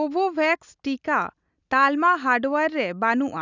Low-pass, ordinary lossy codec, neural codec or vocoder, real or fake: 7.2 kHz; none; none; real